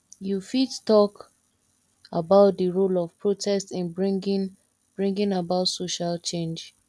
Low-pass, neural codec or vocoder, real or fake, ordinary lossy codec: none; none; real; none